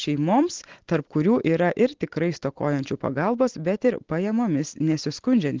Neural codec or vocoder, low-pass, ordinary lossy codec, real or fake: none; 7.2 kHz; Opus, 16 kbps; real